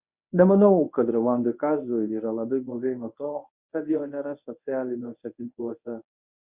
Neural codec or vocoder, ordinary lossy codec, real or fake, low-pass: codec, 24 kHz, 0.9 kbps, WavTokenizer, medium speech release version 1; Opus, 64 kbps; fake; 3.6 kHz